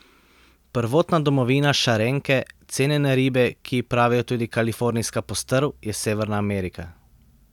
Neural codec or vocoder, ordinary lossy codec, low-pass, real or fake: none; none; 19.8 kHz; real